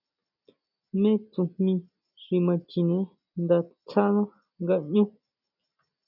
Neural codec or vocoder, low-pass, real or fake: none; 5.4 kHz; real